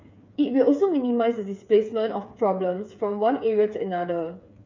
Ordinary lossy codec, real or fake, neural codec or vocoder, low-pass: MP3, 64 kbps; fake; codec, 16 kHz, 8 kbps, FreqCodec, smaller model; 7.2 kHz